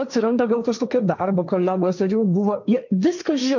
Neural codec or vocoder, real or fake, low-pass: codec, 16 kHz, 1.1 kbps, Voila-Tokenizer; fake; 7.2 kHz